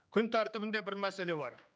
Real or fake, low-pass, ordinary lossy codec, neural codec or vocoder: fake; none; none; codec, 16 kHz, 2 kbps, X-Codec, HuBERT features, trained on general audio